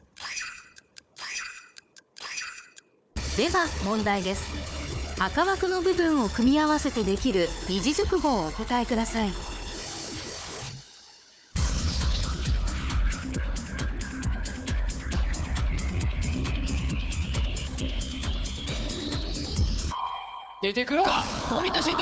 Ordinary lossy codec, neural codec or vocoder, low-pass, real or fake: none; codec, 16 kHz, 4 kbps, FunCodec, trained on Chinese and English, 50 frames a second; none; fake